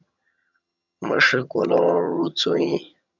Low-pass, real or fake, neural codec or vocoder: 7.2 kHz; fake; vocoder, 22.05 kHz, 80 mel bands, HiFi-GAN